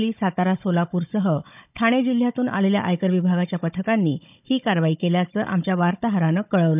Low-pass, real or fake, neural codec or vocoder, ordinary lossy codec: 3.6 kHz; fake; codec, 16 kHz, 16 kbps, FunCodec, trained on Chinese and English, 50 frames a second; none